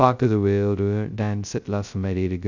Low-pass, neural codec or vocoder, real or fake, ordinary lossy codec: 7.2 kHz; codec, 16 kHz, 0.2 kbps, FocalCodec; fake; none